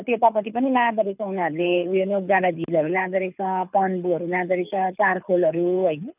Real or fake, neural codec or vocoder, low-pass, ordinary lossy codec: fake; codec, 24 kHz, 6 kbps, HILCodec; 3.6 kHz; none